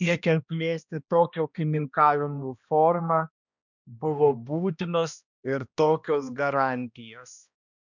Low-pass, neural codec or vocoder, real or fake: 7.2 kHz; codec, 16 kHz, 1 kbps, X-Codec, HuBERT features, trained on balanced general audio; fake